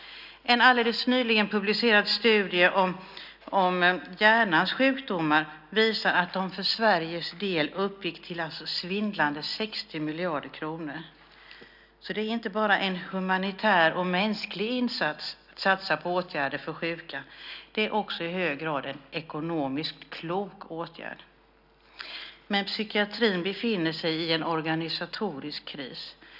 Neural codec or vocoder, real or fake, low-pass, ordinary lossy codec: none; real; 5.4 kHz; none